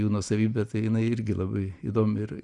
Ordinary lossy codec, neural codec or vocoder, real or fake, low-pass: Opus, 64 kbps; none; real; 10.8 kHz